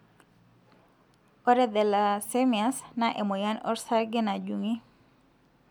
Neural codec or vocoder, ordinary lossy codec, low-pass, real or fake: none; MP3, 96 kbps; 19.8 kHz; real